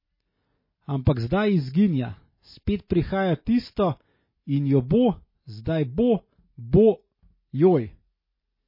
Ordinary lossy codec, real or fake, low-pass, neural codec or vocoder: MP3, 24 kbps; real; 5.4 kHz; none